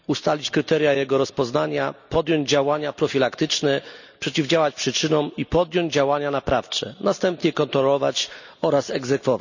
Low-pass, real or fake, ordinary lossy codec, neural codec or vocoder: 7.2 kHz; real; none; none